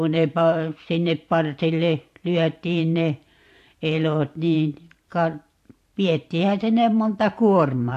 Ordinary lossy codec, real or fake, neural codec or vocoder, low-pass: AAC, 64 kbps; fake; vocoder, 44.1 kHz, 128 mel bands every 512 samples, BigVGAN v2; 14.4 kHz